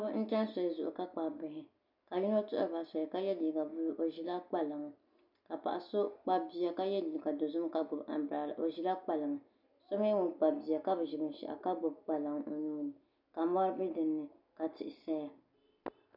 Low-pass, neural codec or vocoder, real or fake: 5.4 kHz; none; real